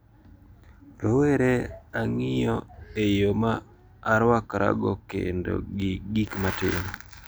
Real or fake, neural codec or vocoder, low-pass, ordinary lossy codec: real; none; none; none